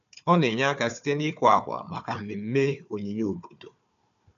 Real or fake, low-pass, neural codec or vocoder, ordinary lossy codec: fake; 7.2 kHz; codec, 16 kHz, 4 kbps, FunCodec, trained on Chinese and English, 50 frames a second; none